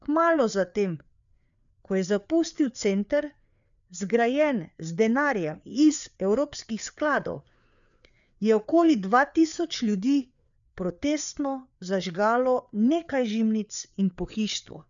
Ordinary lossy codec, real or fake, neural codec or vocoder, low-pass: none; fake; codec, 16 kHz, 4 kbps, FreqCodec, larger model; 7.2 kHz